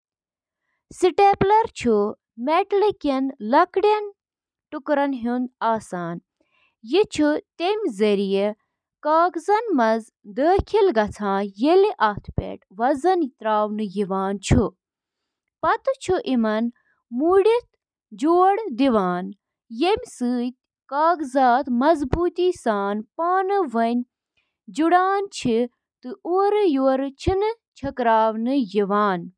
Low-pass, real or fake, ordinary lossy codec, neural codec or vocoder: 9.9 kHz; real; none; none